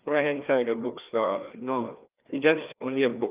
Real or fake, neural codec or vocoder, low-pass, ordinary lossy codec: fake; codec, 16 kHz, 2 kbps, FreqCodec, larger model; 3.6 kHz; Opus, 24 kbps